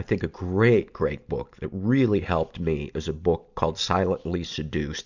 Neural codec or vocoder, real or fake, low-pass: none; real; 7.2 kHz